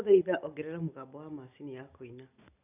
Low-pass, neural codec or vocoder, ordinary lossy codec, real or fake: 3.6 kHz; none; none; real